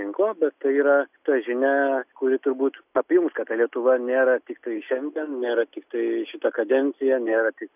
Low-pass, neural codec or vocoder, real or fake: 3.6 kHz; none; real